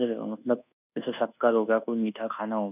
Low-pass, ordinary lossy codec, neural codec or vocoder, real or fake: 3.6 kHz; none; codec, 24 kHz, 1.2 kbps, DualCodec; fake